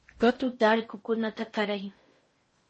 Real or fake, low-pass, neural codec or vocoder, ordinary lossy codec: fake; 10.8 kHz; codec, 16 kHz in and 24 kHz out, 0.6 kbps, FocalCodec, streaming, 4096 codes; MP3, 32 kbps